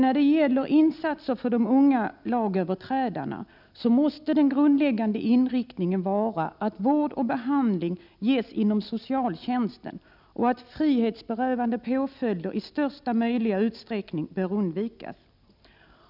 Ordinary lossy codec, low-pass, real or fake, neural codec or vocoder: none; 5.4 kHz; real; none